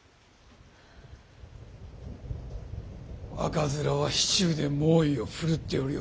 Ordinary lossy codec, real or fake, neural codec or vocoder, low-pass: none; real; none; none